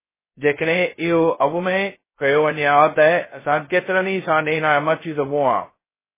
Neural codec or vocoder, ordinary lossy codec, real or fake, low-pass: codec, 16 kHz, 0.2 kbps, FocalCodec; MP3, 16 kbps; fake; 3.6 kHz